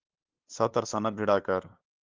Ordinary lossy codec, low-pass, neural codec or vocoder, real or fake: Opus, 32 kbps; 7.2 kHz; codec, 16 kHz, 2 kbps, FunCodec, trained on LibriTTS, 25 frames a second; fake